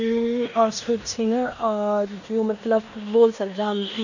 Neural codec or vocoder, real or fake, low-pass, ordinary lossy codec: codec, 16 kHz in and 24 kHz out, 0.9 kbps, LongCat-Audio-Codec, fine tuned four codebook decoder; fake; 7.2 kHz; none